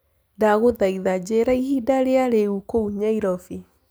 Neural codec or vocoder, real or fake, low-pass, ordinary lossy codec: none; real; none; none